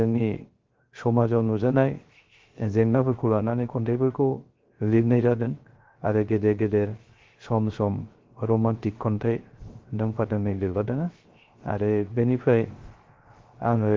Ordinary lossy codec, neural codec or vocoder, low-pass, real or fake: Opus, 16 kbps; codec, 16 kHz, 0.3 kbps, FocalCodec; 7.2 kHz; fake